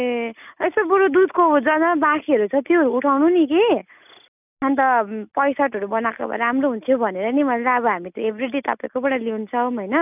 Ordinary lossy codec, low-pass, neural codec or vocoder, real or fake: none; 3.6 kHz; none; real